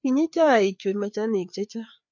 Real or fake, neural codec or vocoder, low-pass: fake; codec, 16 kHz, 4 kbps, FreqCodec, larger model; 7.2 kHz